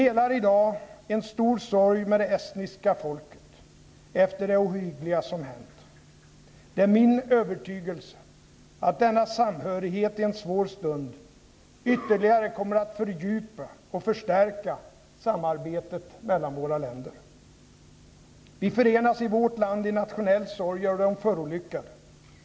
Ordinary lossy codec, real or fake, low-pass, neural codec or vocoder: none; real; none; none